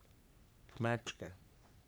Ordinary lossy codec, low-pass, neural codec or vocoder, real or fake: none; none; codec, 44.1 kHz, 3.4 kbps, Pupu-Codec; fake